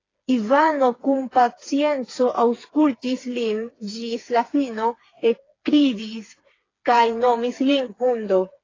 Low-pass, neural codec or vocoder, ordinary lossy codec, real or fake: 7.2 kHz; codec, 16 kHz, 4 kbps, FreqCodec, smaller model; AAC, 32 kbps; fake